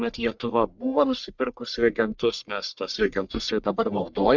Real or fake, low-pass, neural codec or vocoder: fake; 7.2 kHz; codec, 44.1 kHz, 1.7 kbps, Pupu-Codec